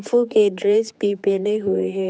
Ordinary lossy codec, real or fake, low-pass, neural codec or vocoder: none; fake; none; codec, 16 kHz, 4 kbps, X-Codec, HuBERT features, trained on general audio